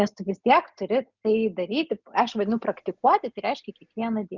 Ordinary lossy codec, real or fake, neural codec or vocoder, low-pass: Opus, 64 kbps; real; none; 7.2 kHz